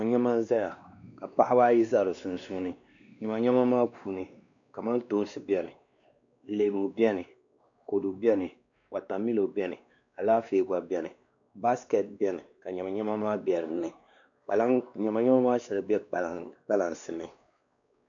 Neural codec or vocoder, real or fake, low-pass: codec, 16 kHz, 2 kbps, X-Codec, WavLM features, trained on Multilingual LibriSpeech; fake; 7.2 kHz